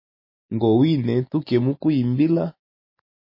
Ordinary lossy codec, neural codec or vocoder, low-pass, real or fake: MP3, 24 kbps; none; 5.4 kHz; real